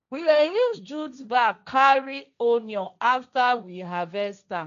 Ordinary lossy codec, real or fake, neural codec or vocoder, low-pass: none; fake; codec, 16 kHz, 1.1 kbps, Voila-Tokenizer; 7.2 kHz